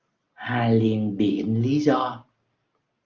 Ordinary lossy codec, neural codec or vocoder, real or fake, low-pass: Opus, 24 kbps; none; real; 7.2 kHz